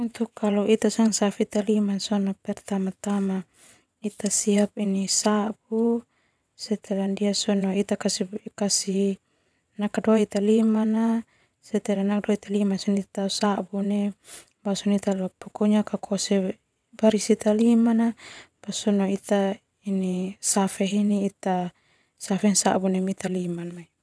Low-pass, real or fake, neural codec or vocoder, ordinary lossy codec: none; fake; vocoder, 22.05 kHz, 80 mel bands, WaveNeXt; none